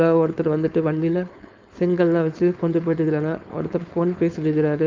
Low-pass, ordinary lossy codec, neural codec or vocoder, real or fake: 7.2 kHz; Opus, 24 kbps; codec, 16 kHz, 4.8 kbps, FACodec; fake